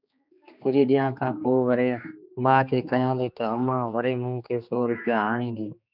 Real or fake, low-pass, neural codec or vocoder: fake; 5.4 kHz; codec, 16 kHz, 2 kbps, X-Codec, HuBERT features, trained on general audio